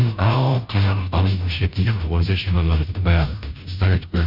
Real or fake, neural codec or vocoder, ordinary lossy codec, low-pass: fake; codec, 16 kHz, 0.5 kbps, FunCodec, trained on Chinese and English, 25 frames a second; none; 5.4 kHz